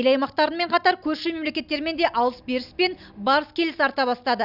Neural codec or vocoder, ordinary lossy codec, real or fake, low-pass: none; none; real; 5.4 kHz